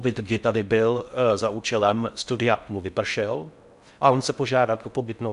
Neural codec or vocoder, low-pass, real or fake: codec, 16 kHz in and 24 kHz out, 0.6 kbps, FocalCodec, streaming, 4096 codes; 10.8 kHz; fake